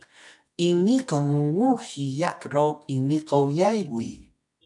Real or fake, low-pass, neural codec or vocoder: fake; 10.8 kHz; codec, 24 kHz, 0.9 kbps, WavTokenizer, medium music audio release